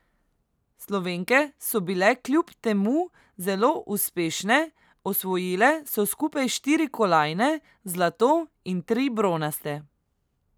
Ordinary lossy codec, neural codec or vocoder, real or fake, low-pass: none; none; real; none